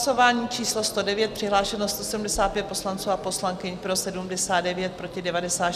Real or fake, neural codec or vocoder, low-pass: real; none; 14.4 kHz